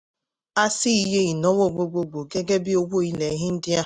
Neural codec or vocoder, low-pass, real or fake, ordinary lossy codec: none; none; real; none